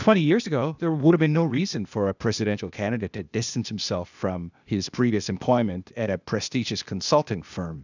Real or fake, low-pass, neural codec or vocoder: fake; 7.2 kHz; codec, 16 kHz, 0.8 kbps, ZipCodec